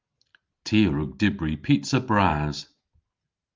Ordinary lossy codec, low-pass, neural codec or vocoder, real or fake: Opus, 24 kbps; 7.2 kHz; none; real